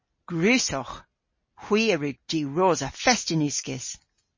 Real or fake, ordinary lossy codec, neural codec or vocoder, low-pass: real; MP3, 32 kbps; none; 7.2 kHz